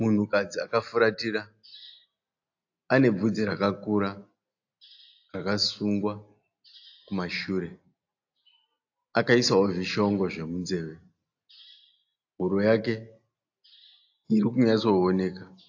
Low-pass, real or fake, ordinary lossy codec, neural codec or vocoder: 7.2 kHz; real; AAC, 48 kbps; none